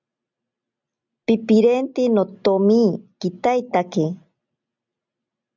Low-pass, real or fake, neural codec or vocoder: 7.2 kHz; real; none